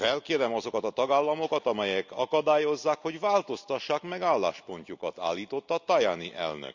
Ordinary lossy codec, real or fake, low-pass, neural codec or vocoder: none; real; 7.2 kHz; none